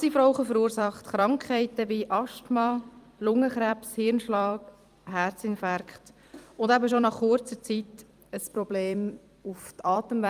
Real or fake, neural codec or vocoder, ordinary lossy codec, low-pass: fake; vocoder, 44.1 kHz, 128 mel bands every 256 samples, BigVGAN v2; Opus, 32 kbps; 14.4 kHz